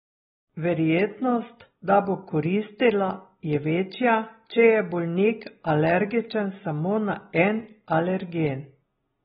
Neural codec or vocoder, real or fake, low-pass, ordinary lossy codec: none; real; 10.8 kHz; AAC, 16 kbps